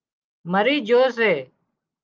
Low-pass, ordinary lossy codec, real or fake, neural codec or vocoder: 7.2 kHz; Opus, 24 kbps; real; none